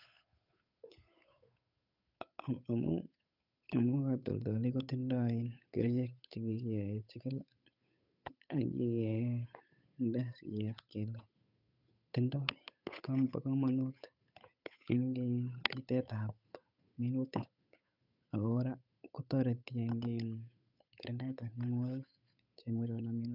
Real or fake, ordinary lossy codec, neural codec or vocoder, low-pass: fake; none; codec, 16 kHz, 8 kbps, FunCodec, trained on Chinese and English, 25 frames a second; 5.4 kHz